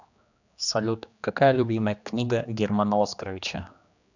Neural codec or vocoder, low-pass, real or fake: codec, 16 kHz, 2 kbps, X-Codec, HuBERT features, trained on general audio; 7.2 kHz; fake